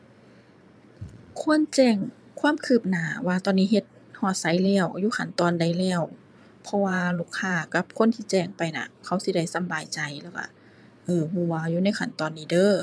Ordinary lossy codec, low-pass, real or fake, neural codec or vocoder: none; none; fake; vocoder, 22.05 kHz, 80 mel bands, Vocos